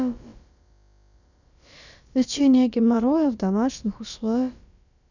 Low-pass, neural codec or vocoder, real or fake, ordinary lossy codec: 7.2 kHz; codec, 16 kHz, about 1 kbps, DyCAST, with the encoder's durations; fake; none